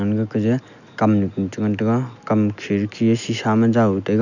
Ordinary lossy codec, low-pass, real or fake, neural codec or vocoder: none; 7.2 kHz; real; none